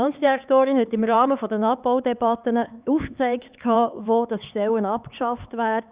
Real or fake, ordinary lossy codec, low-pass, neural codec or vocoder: fake; Opus, 64 kbps; 3.6 kHz; codec, 16 kHz, 4 kbps, X-Codec, HuBERT features, trained on LibriSpeech